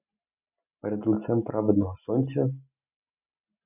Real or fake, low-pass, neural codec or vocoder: real; 3.6 kHz; none